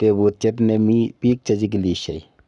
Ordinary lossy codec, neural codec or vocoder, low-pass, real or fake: none; codec, 44.1 kHz, 7.8 kbps, Pupu-Codec; 10.8 kHz; fake